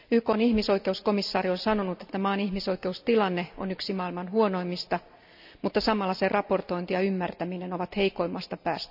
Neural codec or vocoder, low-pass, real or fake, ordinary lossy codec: none; 5.4 kHz; real; none